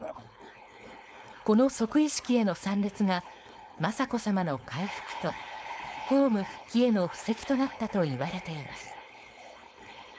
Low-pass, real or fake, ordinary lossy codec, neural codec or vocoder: none; fake; none; codec, 16 kHz, 4.8 kbps, FACodec